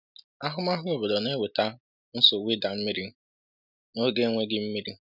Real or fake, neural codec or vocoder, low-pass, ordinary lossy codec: real; none; 5.4 kHz; none